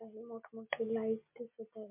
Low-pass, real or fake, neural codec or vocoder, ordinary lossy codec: 3.6 kHz; real; none; MP3, 32 kbps